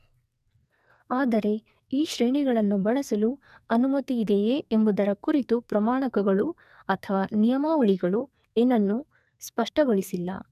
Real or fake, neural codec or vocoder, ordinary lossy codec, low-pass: fake; codec, 44.1 kHz, 2.6 kbps, SNAC; none; 14.4 kHz